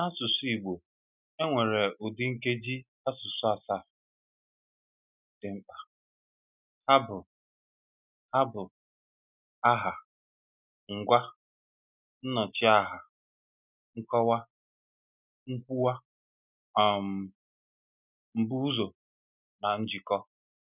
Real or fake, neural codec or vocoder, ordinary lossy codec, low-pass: real; none; none; 3.6 kHz